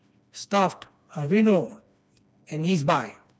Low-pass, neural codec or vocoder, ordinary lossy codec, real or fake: none; codec, 16 kHz, 2 kbps, FreqCodec, smaller model; none; fake